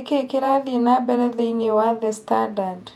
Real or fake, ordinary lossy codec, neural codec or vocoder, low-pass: fake; none; vocoder, 48 kHz, 128 mel bands, Vocos; 19.8 kHz